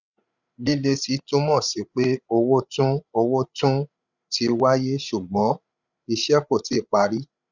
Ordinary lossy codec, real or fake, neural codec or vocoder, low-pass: none; fake; codec, 16 kHz, 16 kbps, FreqCodec, larger model; 7.2 kHz